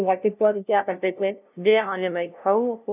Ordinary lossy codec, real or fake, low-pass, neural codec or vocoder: none; fake; 3.6 kHz; codec, 16 kHz, 0.5 kbps, FunCodec, trained on LibriTTS, 25 frames a second